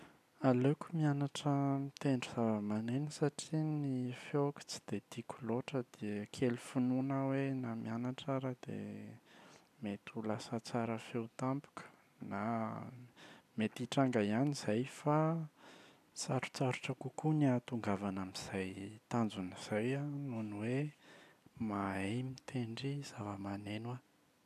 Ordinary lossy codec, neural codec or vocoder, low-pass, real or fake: none; none; 14.4 kHz; real